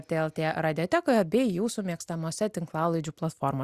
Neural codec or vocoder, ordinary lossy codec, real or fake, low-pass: none; Opus, 64 kbps; real; 14.4 kHz